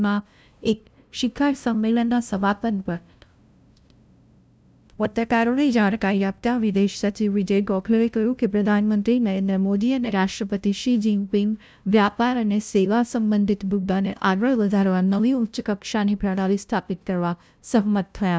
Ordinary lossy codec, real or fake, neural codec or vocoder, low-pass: none; fake; codec, 16 kHz, 0.5 kbps, FunCodec, trained on LibriTTS, 25 frames a second; none